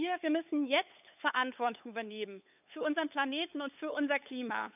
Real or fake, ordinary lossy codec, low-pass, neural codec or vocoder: fake; none; 3.6 kHz; codec, 16 kHz, 8 kbps, FunCodec, trained on LibriTTS, 25 frames a second